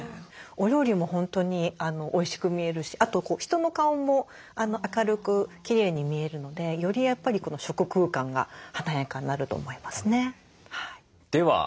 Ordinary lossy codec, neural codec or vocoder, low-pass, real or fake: none; none; none; real